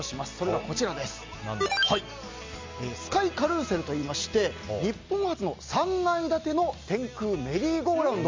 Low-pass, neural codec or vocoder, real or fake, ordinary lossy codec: 7.2 kHz; none; real; MP3, 64 kbps